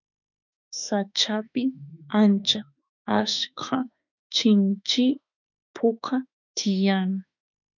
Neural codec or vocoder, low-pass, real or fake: autoencoder, 48 kHz, 32 numbers a frame, DAC-VAE, trained on Japanese speech; 7.2 kHz; fake